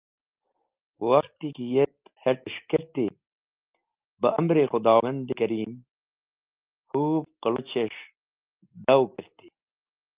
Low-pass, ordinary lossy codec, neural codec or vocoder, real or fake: 3.6 kHz; Opus, 32 kbps; none; real